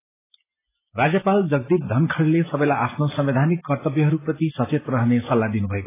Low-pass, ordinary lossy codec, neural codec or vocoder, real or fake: 3.6 kHz; AAC, 16 kbps; none; real